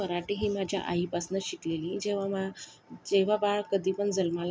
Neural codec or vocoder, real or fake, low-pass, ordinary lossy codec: none; real; none; none